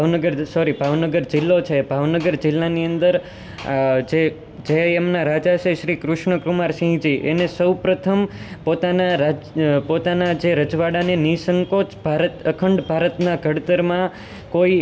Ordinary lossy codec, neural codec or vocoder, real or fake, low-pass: none; none; real; none